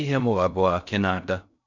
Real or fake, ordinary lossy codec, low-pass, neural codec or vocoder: fake; none; 7.2 kHz; codec, 16 kHz in and 24 kHz out, 0.6 kbps, FocalCodec, streaming, 2048 codes